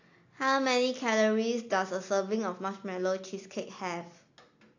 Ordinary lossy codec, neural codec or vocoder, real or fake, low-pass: AAC, 48 kbps; none; real; 7.2 kHz